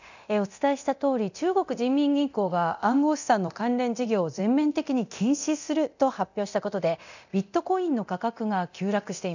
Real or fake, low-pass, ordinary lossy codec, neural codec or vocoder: fake; 7.2 kHz; none; codec, 24 kHz, 0.9 kbps, DualCodec